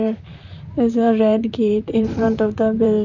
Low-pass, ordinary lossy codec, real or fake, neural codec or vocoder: 7.2 kHz; none; fake; vocoder, 44.1 kHz, 128 mel bands, Pupu-Vocoder